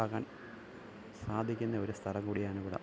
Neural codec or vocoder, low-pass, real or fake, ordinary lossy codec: none; none; real; none